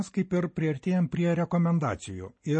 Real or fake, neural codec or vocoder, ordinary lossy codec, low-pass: real; none; MP3, 32 kbps; 9.9 kHz